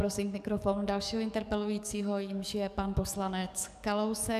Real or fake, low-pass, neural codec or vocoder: fake; 14.4 kHz; codec, 44.1 kHz, 7.8 kbps, DAC